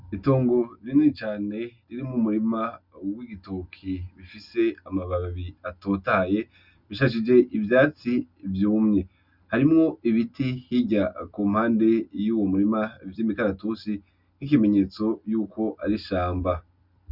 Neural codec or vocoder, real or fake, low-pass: none; real; 5.4 kHz